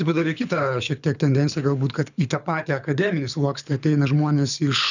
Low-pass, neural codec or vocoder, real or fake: 7.2 kHz; codec, 24 kHz, 6 kbps, HILCodec; fake